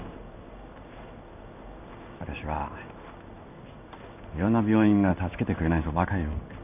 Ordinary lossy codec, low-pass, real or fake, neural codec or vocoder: none; 3.6 kHz; fake; codec, 16 kHz in and 24 kHz out, 1 kbps, XY-Tokenizer